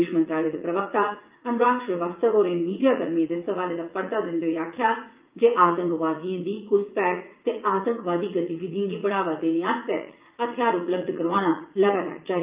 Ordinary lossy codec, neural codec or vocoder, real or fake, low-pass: Opus, 32 kbps; vocoder, 44.1 kHz, 80 mel bands, Vocos; fake; 3.6 kHz